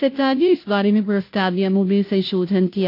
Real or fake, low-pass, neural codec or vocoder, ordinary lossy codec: fake; 5.4 kHz; codec, 16 kHz, 0.5 kbps, FunCodec, trained on Chinese and English, 25 frames a second; AAC, 32 kbps